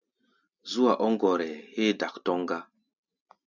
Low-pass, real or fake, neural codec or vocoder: 7.2 kHz; real; none